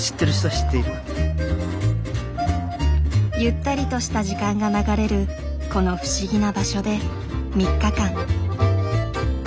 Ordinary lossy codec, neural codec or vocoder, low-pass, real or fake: none; none; none; real